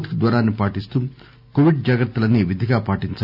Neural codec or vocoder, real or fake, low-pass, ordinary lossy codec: none; real; 5.4 kHz; none